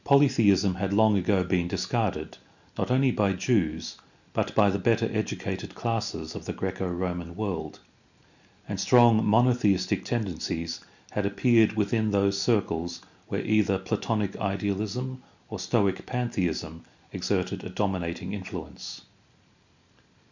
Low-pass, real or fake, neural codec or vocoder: 7.2 kHz; real; none